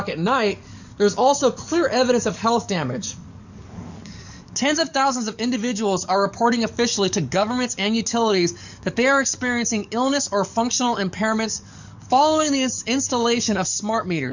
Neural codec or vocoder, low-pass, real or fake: codec, 44.1 kHz, 7.8 kbps, DAC; 7.2 kHz; fake